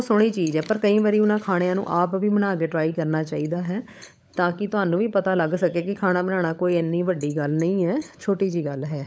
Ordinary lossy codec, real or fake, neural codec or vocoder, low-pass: none; fake; codec, 16 kHz, 16 kbps, FunCodec, trained on LibriTTS, 50 frames a second; none